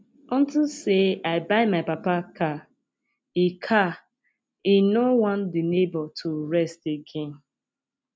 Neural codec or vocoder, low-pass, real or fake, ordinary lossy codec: none; none; real; none